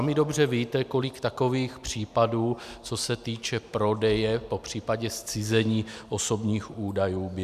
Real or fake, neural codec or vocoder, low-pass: real; none; 14.4 kHz